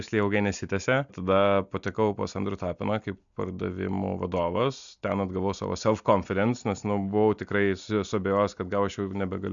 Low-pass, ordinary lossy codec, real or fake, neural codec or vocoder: 7.2 kHz; MP3, 96 kbps; real; none